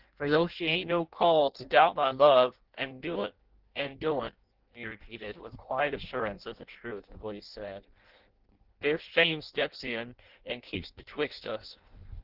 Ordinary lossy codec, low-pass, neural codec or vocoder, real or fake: Opus, 16 kbps; 5.4 kHz; codec, 16 kHz in and 24 kHz out, 0.6 kbps, FireRedTTS-2 codec; fake